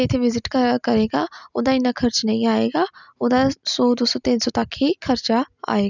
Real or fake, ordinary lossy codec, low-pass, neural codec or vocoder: real; none; 7.2 kHz; none